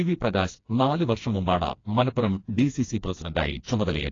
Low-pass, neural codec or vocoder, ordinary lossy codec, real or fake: 7.2 kHz; codec, 16 kHz, 2 kbps, FreqCodec, smaller model; AAC, 32 kbps; fake